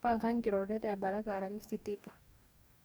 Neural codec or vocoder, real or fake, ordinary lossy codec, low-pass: codec, 44.1 kHz, 2.6 kbps, DAC; fake; none; none